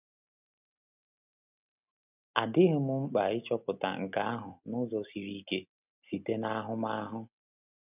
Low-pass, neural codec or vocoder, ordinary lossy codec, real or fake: 3.6 kHz; none; none; real